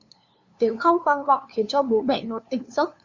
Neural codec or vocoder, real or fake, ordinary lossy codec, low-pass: codec, 16 kHz, 2 kbps, FunCodec, trained on LibriTTS, 25 frames a second; fake; Opus, 64 kbps; 7.2 kHz